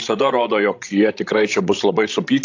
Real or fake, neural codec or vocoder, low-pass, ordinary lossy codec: fake; codec, 16 kHz, 16 kbps, FreqCodec, larger model; 7.2 kHz; AAC, 48 kbps